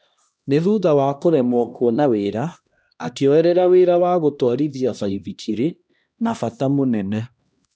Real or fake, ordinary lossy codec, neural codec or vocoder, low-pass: fake; none; codec, 16 kHz, 1 kbps, X-Codec, HuBERT features, trained on LibriSpeech; none